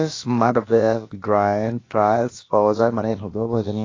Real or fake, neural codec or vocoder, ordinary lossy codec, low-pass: fake; codec, 16 kHz, about 1 kbps, DyCAST, with the encoder's durations; AAC, 32 kbps; 7.2 kHz